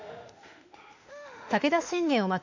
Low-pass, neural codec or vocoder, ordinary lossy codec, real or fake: 7.2 kHz; autoencoder, 48 kHz, 32 numbers a frame, DAC-VAE, trained on Japanese speech; none; fake